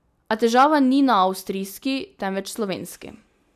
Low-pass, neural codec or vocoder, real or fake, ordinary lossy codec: 14.4 kHz; none; real; none